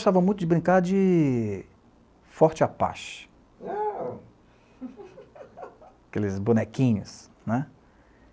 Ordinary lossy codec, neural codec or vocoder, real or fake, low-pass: none; none; real; none